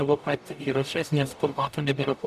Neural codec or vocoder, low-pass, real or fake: codec, 44.1 kHz, 0.9 kbps, DAC; 14.4 kHz; fake